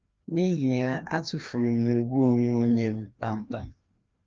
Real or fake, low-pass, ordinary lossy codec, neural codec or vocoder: fake; 7.2 kHz; Opus, 24 kbps; codec, 16 kHz, 1 kbps, FreqCodec, larger model